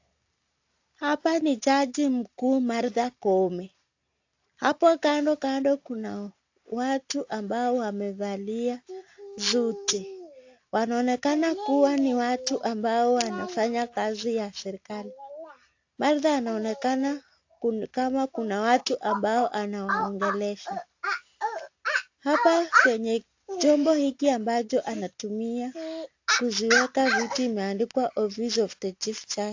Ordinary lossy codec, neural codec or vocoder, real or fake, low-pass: AAC, 48 kbps; none; real; 7.2 kHz